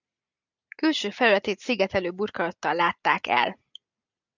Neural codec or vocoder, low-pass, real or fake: none; 7.2 kHz; real